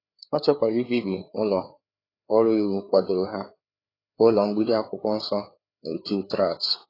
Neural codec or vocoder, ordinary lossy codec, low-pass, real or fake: codec, 16 kHz, 4 kbps, FreqCodec, larger model; AAC, 24 kbps; 5.4 kHz; fake